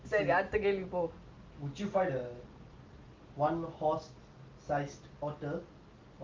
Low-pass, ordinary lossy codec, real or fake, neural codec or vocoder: 7.2 kHz; Opus, 32 kbps; real; none